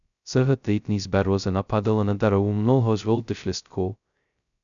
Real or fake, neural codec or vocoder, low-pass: fake; codec, 16 kHz, 0.2 kbps, FocalCodec; 7.2 kHz